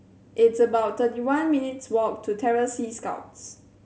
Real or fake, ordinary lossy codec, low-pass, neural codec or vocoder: real; none; none; none